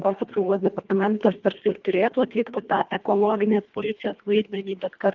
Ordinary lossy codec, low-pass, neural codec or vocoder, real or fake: Opus, 24 kbps; 7.2 kHz; codec, 24 kHz, 1.5 kbps, HILCodec; fake